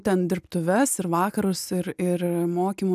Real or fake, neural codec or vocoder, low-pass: real; none; 14.4 kHz